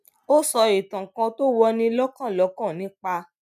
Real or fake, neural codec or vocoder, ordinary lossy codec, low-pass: real; none; none; 14.4 kHz